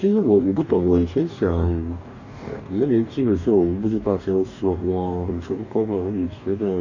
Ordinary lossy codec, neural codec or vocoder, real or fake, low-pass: none; codec, 44.1 kHz, 2.6 kbps, DAC; fake; 7.2 kHz